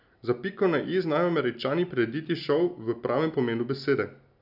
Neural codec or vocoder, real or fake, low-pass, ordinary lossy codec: none; real; 5.4 kHz; none